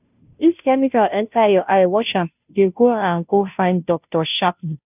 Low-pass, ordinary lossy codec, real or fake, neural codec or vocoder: 3.6 kHz; none; fake; codec, 16 kHz, 0.5 kbps, FunCodec, trained on Chinese and English, 25 frames a second